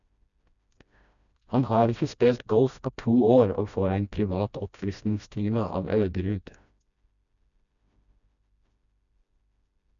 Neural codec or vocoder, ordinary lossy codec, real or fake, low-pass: codec, 16 kHz, 1 kbps, FreqCodec, smaller model; none; fake; 7.2 kHz